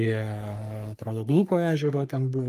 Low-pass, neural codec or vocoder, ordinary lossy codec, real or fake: 14.4 kHz; codec, 44.1 kHz, 3.4 kbps, Pupu-Codec; Opus, 32 kbps; fake